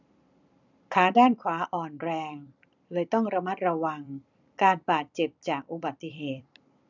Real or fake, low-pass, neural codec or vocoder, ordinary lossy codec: fake; 7.2 kHz; vocoder, 44.1 kHz, 128 mel bands every 256 samples, BigVGAN v2; none